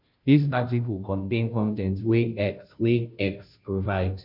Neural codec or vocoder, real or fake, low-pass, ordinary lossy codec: codec, 16 kHz, 0.5 kbps, FunCodec, trained on Chinese and English, 25 frames a second; fake; 5.4 kHz; none